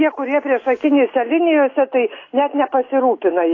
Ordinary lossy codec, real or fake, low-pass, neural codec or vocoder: AAC, 32 kbps; real; 7.2 kHz; none